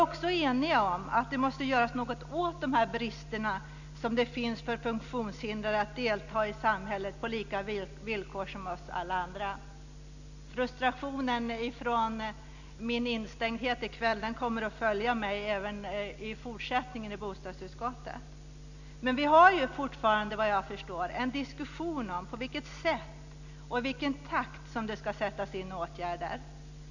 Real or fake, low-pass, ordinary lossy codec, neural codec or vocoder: real; 7.2 kHz; none; none